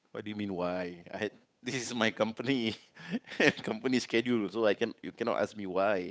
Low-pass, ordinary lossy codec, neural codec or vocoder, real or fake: none; none; codec, 16 kHz, 8 kbps, FunCodec, trained on Chinese and English, 25 frames a second; fake